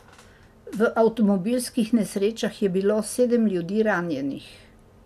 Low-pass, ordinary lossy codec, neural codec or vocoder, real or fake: 14.4 kHz; none; none; real